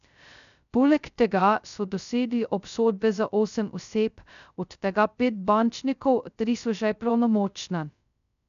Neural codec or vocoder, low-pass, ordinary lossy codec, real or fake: codec, 16 kHz, 0.2 kbps, FocalCodec; 7.2 kHz; none; fake